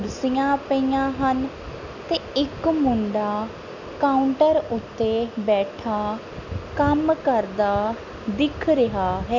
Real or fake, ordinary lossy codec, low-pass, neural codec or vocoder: real; none; 7.2 kHz; none